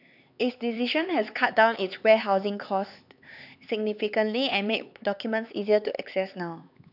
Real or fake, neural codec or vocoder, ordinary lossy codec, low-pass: fake; codec, 16 kHz, 4 kbps, X-Codec, HuBERT features, trained on LibriSpeech; none; 5.4 kHz